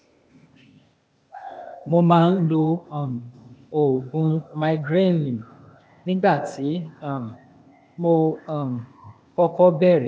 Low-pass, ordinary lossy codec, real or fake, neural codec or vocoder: none; none; fake; codec, 16 kHz, 0.8 kbps, ZipCodec